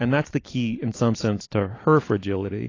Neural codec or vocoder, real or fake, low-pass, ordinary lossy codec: none; real; 7.2 kHz; AAC, 32 kbps